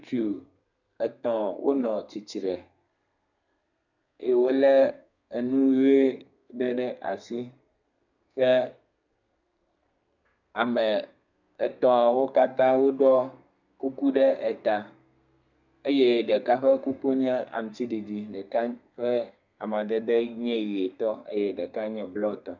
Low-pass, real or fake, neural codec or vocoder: 7.2 kHz; fake; codec, 32 kHz, 1.9 kbps, SNAC